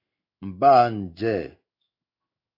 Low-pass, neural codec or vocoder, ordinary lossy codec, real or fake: 5.4 kHz; codec, 16 kHz in and 24 kHz out, 1 kbps, XY-Tokenizer; AAC, 32 kbps; fake